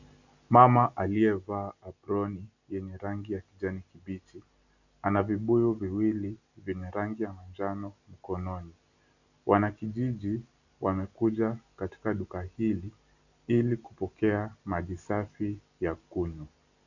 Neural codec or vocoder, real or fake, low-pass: none; real; 7.2 kHz